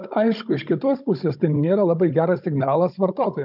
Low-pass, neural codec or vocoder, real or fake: 5.4 kHz; codec, 16 kHz, 16 kbps, FunCodec, trained on Chinese and English, 50 frames a second; fake